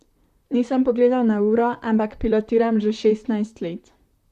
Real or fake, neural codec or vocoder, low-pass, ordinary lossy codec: fake; vocoder, 44.1 kHz, 128 mel bands, Pupu-Vocoder; 14.4 kHz; Opus, 64 kbps